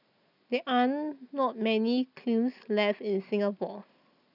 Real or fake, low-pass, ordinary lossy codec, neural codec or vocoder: real; 5.4 kHz; none; none